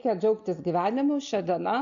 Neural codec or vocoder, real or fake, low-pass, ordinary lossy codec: none; real; 7.2 kHz; AAC, 64 kbps